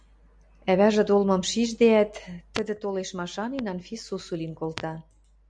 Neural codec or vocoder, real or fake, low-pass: none; real; 9.9 kHz